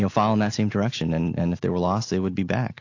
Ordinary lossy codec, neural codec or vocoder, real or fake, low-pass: AAC, 48 kbps; none; real; 7.2 kHz